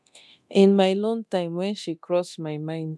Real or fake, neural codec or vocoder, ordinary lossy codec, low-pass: fake; codec, 24 kHz, 0.9 kbps, DualCodec; none; none